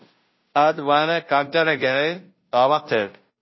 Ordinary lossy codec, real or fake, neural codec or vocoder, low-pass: MP3, 24 kbps; fake; codec, 16 kHz, 0.5 kbps, FunCodec, trained on Chinese and English, 25 frames a second; 7.2 kHz